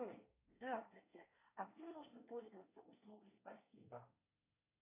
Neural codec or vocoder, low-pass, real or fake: codec, 24 kHz, 1 kbps, SNAC; 3.6 kHz; fake